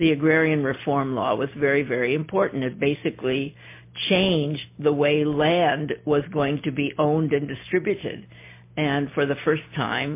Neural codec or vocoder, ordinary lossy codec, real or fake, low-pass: none; MP3, 24 kbps; real; 3.6 kHz